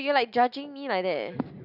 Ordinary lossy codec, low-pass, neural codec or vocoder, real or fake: none; 5.4 kHz; none; real